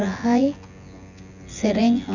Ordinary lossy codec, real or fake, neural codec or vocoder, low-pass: none; fake; vocoder, 24 kHz, 100 mel bands, Vocos; 7.2 kHz